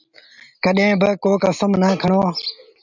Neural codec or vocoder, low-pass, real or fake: none; 7.2 kHz; real